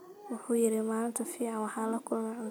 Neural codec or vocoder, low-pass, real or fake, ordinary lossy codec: none; none; real; none